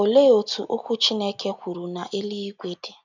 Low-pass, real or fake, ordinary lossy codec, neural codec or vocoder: 7.2 kHz; real; none; none